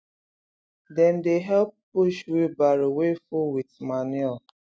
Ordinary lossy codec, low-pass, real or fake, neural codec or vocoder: none; none; real; none